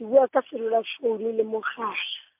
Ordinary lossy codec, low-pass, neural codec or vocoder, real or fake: MP3, 32 kbps; 3.6 kHz; none; real